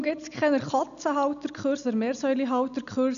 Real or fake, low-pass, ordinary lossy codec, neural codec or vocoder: real; 7.2 kHz; none; none